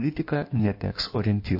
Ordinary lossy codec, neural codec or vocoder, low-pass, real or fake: MP3, 32 kbps; codec, 16 kHz in and 24 kHz out, 1.1 kbps, FireRedTTS-2 codec; 5.4 kHz; fake